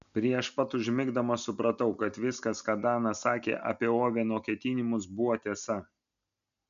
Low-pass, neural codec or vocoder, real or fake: 7.2 kHz; none; real